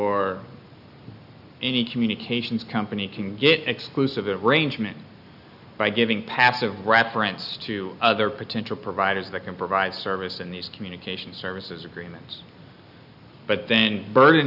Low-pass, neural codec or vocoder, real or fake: 5.4 kHz; none; real